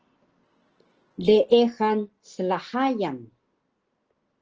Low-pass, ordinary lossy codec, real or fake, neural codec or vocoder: 7.2 kHz; Opus, 16 kbps; real; none